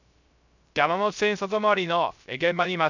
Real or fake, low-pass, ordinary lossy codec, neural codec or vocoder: fake; 7.2 kHz; none; codec, 16 kHz, 0.3 kbps, FocalCodec